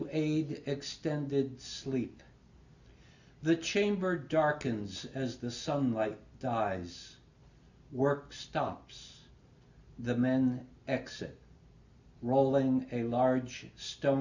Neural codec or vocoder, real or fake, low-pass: none; real; 7.2 kHz